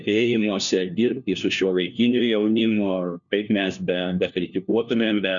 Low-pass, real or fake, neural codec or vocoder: 7.2 kHz; fake; codec, 16 kHz, 1 kbps, FunCodec, trained on LibriTTS, 50 frames a second